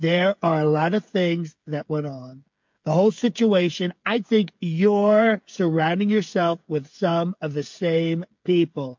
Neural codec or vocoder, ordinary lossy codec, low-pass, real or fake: codec, 16 kHz, 8 kbps, FreqCodec, smaller model; MP3, 48 kbps; 7.2 kHz; fake